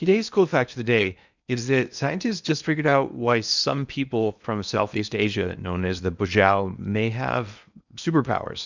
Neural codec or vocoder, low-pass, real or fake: codec, 16 kHz in and 24 kHz out, 0.8 kbps, FocalCodec, streaming, 65536 codes; 7.2 kHz; fake